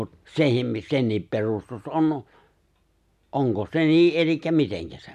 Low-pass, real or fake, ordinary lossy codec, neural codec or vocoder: 14.4 kHz; real; none; none